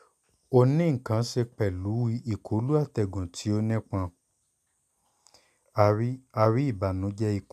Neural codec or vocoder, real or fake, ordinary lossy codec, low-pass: none; real; none; 14.4 kHz